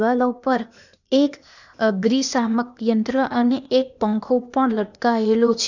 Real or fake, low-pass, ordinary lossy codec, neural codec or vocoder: fake; 7.2 kHz; none; codec, 16 kHz, 0.8 kbps, ZipCodec